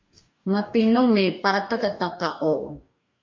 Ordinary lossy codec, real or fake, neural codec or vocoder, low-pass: MP3, 48 kbps; fake; codec, 44.1 kHz, 2.6 kbps, DAC; 7.2 kHz